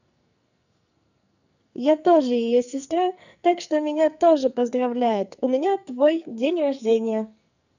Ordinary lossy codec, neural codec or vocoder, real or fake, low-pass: none; codec, 44.1 kHz, 2.6 kbps, SNAC; fake; 7.2 kHz